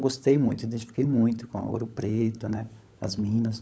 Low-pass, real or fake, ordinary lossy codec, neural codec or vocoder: none; fake; none; codec, 16 kHz, 16 kbps, FunCodec, trained on LibriTTS, 50 frames a second